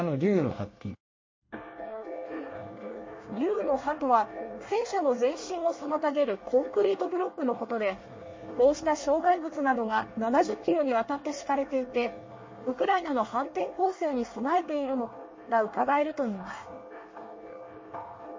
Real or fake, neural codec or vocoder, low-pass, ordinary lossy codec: fake; codec, 24 kHz, 1 kbps, SNAC; 7.2 kHz; MP3, 32 kbps